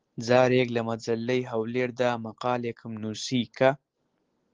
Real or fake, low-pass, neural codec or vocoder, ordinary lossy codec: real; 7.2 kHz; none; Opus, 16 kbps